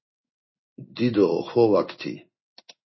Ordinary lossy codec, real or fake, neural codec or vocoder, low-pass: MP3, 24 kbps; fake; codec, 16 kHz in and 24 kHz out, 1 kbps, XY-Tokenizer; 7.2 kHz